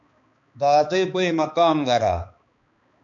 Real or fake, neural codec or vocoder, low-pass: fake; codec, 16 kHz, 2 kbps, X-Codec, HuBERT features, trained on balanced general audio; 7.2 kHz